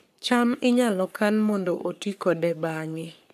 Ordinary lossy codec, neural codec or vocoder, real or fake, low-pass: none; codec, 44.1 kHz, 3.4 kbps, Pupu-Codec; fake; 14.4 kHz